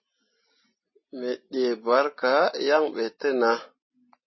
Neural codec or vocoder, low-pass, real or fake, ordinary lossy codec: none; 7.2 kHz; real; MP3, 24 kbps